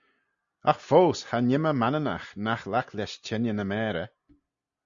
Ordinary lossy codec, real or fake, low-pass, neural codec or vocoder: Opus, 64 kbps; real; 7.2 kHz; none